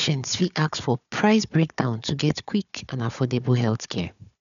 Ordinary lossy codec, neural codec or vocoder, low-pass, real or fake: none; codec, 16 kHz, 4 kbps, FunCodec, trained on Chinese and English, 50 frames a second; 7.2 kHz; fake